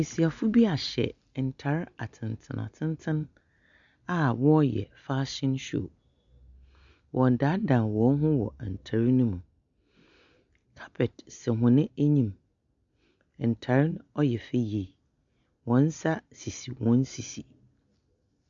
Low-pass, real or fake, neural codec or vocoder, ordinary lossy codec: 7.2 kHz; real; none; MP3, 96 kbps